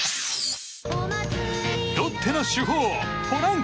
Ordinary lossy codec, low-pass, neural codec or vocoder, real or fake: none; none; none; real